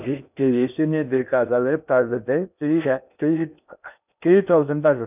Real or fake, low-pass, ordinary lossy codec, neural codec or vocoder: fake; 3.6 kHz; none; codec, 16 kHz in and 24 kHz out, 0.6 kbps, FocalCodec, streaming, 2048 codes